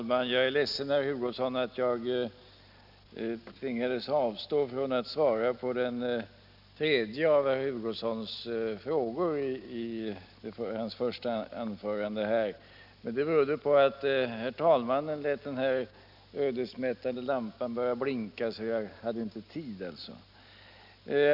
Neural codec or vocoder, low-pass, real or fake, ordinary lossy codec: none; 5.4 kHz; real; none